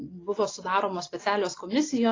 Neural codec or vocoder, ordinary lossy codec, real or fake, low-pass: none; AAC, 32 kbps; real; 7.2 kHz